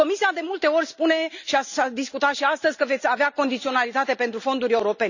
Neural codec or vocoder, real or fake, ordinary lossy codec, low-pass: none; real; none; 7.2 kHz